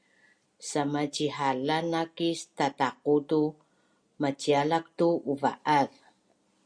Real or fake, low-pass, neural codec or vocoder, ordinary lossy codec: real; 9.9 kHz; none; Opus, 64 kbps